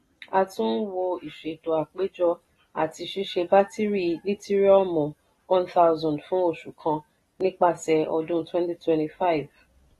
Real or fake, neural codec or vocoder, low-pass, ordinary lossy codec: real; none; 19.8 kHz; AAC, 32 kbps